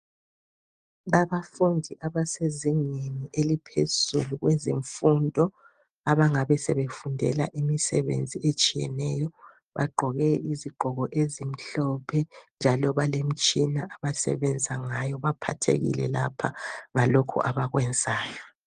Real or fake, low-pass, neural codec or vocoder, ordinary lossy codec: real; 9.9 kHz; none; Opus, 24 kbps